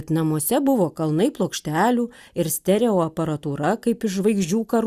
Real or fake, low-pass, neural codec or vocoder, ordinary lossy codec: real; 14.4 kHz; none; Opus, 64 kbps